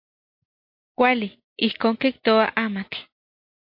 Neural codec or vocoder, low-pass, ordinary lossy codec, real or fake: none; 5.4 kHz; MP3, 32 kbps; real